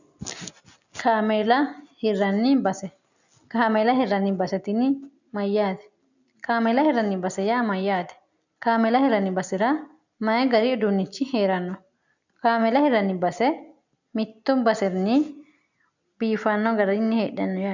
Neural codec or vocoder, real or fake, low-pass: none; real; 7.2 kHz